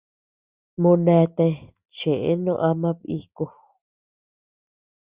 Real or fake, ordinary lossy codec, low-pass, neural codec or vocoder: real; Opus, 64 kbps; 3.6 kHz; none